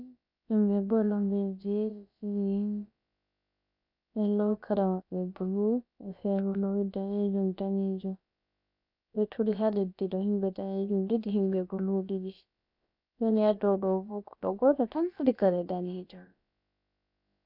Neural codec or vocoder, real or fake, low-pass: codec, 16 kHz, about 1 kbps, DyCAST, with the encoder's durations; fake; 5.4 kHz